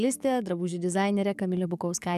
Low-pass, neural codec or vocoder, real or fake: 14.4 kHz; codec, 44.1 kHz, 7.8 kbps, DAC; fake